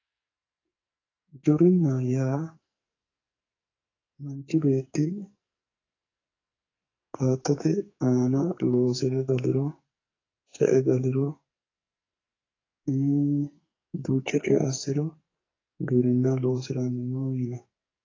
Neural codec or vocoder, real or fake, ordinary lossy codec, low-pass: codec, 44.1 kHz, 2.6 kbps, SNAC; fake; AAC, 32 kbps; 7.2 kHz